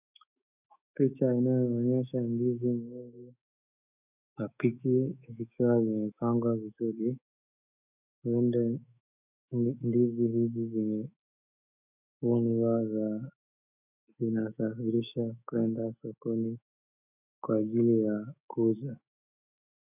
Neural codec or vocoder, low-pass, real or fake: autoencoder, 48 kHz, 128 numbers a frame, DAC-VAE, trained on Japanese speech; 3.6 kHz; fake